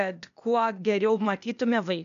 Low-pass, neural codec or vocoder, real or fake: 7.2 kHz; codec, 16 kHz, 0.8 kbps, ZipCodec; fake